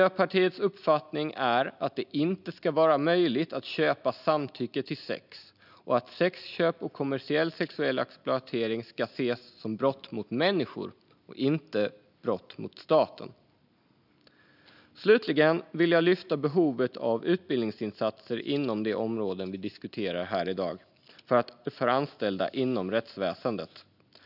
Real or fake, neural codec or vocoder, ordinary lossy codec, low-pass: real; none; none; 5.4 kHz